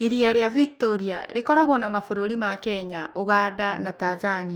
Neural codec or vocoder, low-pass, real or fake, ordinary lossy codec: codec, 44.1 kHz, 2.6 kbps, DAC; none; fake; none